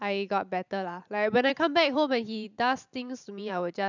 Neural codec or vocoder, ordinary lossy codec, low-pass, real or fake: vocoder, 44.1 kHz, 128 mel bands every 512 samples, BigVGAN v2; none; 7.2 kHz; fake